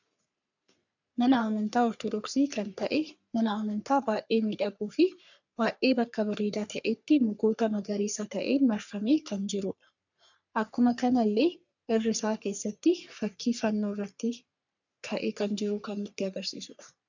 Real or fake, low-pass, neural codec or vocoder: fake; 7.2 kHz; codec, 44.1 kHz, 3.4 kbps, Pupu-Codec